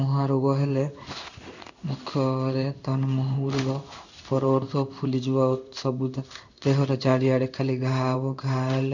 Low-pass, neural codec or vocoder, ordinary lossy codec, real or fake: 7.2 kHz; codec, 16 kHz in and 24 kHz out, 1 kbps, XY-Tokenizer; none; fake